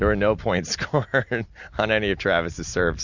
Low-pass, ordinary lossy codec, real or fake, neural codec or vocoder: 7.2 kHz; Opus, 64 kbps; real; none